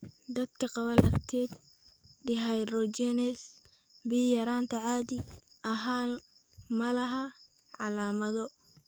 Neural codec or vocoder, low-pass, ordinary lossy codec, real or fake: codec, 44.1 kHz, 7.8 kbps, DAC; none; none; fake